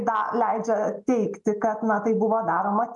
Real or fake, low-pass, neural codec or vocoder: real; 10.8 kHz; none